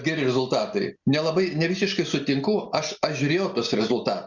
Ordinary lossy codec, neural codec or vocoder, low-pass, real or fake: Opus, 64 kbps; none; 7.2 kHz; real